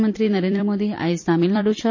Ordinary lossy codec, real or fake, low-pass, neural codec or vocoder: MP3, 32 kbps; fake; 7.2 kHz; vocoder, 44.1 kHz, 128 mel bands every 256 samples, BigVGAN v2